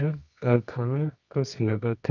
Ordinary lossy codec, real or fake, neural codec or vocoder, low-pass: none; fake; codec, 24 kHz, 0.9 kbps, WavTokenizer, medium music audio release; 7.2 kHz